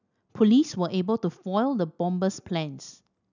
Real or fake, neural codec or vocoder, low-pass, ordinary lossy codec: real; none; 7.2 kHz; none